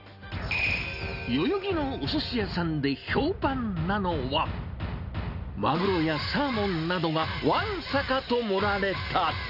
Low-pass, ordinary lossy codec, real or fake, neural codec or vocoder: 5.4 kHz; none; real; none